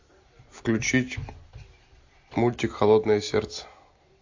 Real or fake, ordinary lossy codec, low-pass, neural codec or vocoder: real; AAC, 48 kbps; 7.2 kHz; none